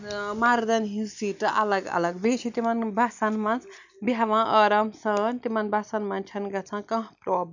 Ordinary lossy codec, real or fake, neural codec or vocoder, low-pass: none; real; none; 7.2 kHz